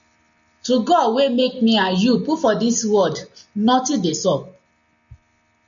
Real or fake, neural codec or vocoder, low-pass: real; none; 7.2 kHz